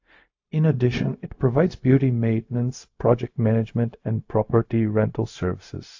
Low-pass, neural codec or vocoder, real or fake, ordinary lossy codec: 7.2 kHz; codec, 16 kHz, 0.4 kbps, LongCat-Audio-Codec; fake; AAC, 48 kbps